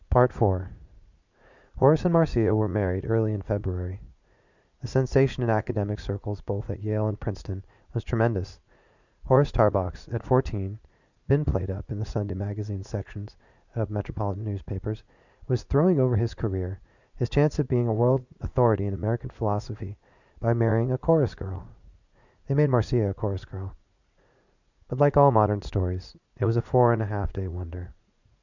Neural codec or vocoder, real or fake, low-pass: vocoder, 44.1 kHz, 80 mel bands, Vocos; fake; 7.2 kHz